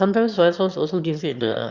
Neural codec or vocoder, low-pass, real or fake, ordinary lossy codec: autoencoder, 22.05 kHz, a latent of 192 numbers a frame, VITS, trained on one speaker; 7.2 kHz; fake; Opus, 64 kbps